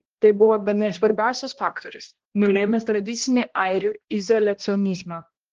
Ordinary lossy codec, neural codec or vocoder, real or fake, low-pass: Opus, 16 kbps; codec, 16 kHz, 1 kbps, X-Codec, HuBERT features, trained on balanced general audio; fake; 7.2 kHz